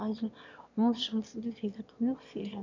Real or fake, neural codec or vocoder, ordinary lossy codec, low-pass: fake; autoencoder, 22.05 kHz, a latent of 192 numbers a frame, VITS, trained on one speaker; none; 7.2 kHz